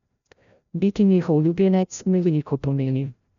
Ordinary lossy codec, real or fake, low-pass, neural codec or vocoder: none; fake; 7.2 kHz; codec, 16 kHz, 0.5 kbps, FreqCodec, larger model